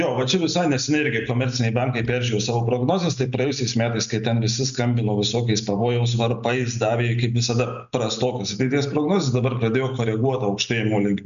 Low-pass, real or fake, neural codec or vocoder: 7.2 kHz; real; none